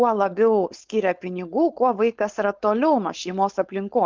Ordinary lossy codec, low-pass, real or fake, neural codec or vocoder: Opus, 24 kbps; 7.2 kHz; fake; codec, 16 kHz, 4.8 kbps, FACodec